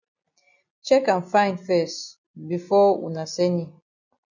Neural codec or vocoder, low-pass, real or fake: none; 7.2 kHz; real